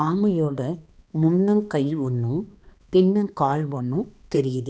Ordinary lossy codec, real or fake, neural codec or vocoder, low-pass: none; fake; codec, 16 kHz, 2 kbps, X-Codec, HuBERT features, trained on balanced general audio; none